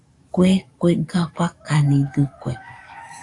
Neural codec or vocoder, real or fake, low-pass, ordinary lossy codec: codec, 44.1 kHz, 7.8 kbps, DAC; fake; 10.8 kHz; AAC, 48 kbps